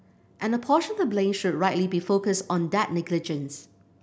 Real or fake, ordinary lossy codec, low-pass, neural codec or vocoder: real; none; none; none